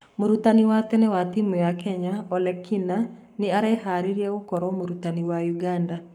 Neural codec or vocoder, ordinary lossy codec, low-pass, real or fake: codec, 44.1 kHz, 7.8 kbps, Pupu-Codec; none; 19.8 kHz; fake